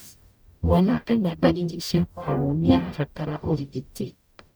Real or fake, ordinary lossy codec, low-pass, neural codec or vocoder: fake; none; none; codec, 44.1 kHz, 0.9 kbps, DAC